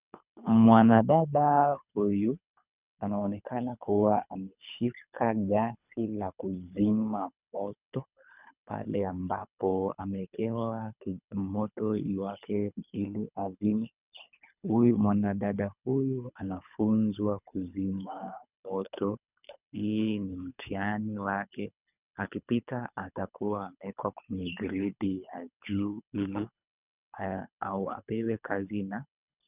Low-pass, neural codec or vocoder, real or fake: 3.6 kHz; codec, 24 kHz, 3 kbps, HILCodec; fake